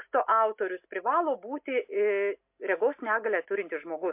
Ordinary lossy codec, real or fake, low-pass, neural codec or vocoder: MP3, 32 kbps; real; 3.6 kHz; none